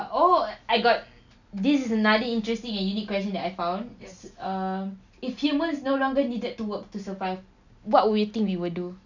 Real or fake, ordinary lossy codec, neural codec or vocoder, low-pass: real; none; none; 7.2 kHz